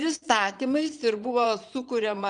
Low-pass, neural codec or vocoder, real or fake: 9.9 kHz; vocoder, 22.05 kHz, 80 mel bands, Vocos; fake